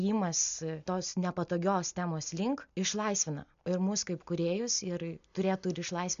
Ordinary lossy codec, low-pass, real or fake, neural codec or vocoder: MP3, 96 kbps; 7.2 kHz; real; none